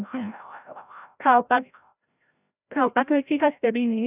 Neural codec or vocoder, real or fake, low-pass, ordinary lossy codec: codec, 16 kHz, 0.5 kbps, FreqCodec, larger model; fake; 3.6 kHz; none